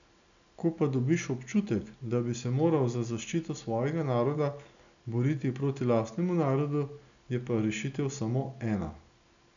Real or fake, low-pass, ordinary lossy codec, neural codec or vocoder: real; 7.2 kHz; none; none